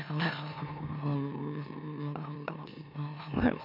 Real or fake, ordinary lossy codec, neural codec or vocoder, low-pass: fake; MP3, 48 kbps; autoencoder, 44.1 kHz, a latent of 192 numbers a frame, MeloTTS; 5.4 kHz